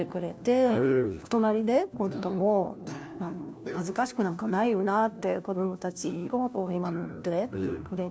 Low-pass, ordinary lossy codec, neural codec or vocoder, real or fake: none; none; codec, 16 kHz, 1 kbps, FunCodec, trained on LibriTTS, 50 frames a second; fake